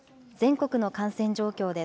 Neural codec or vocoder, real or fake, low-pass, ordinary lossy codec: none; real; none; none